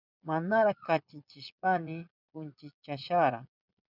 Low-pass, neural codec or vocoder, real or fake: 5.4 kHz; vocoder, 22.05 kHz, 80 mel bands, Vocos; fake